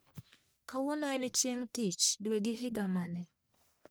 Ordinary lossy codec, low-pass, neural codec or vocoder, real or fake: none; none; codec, 44.1 kHz, 1.7 kbps, Pupu-Codec; fake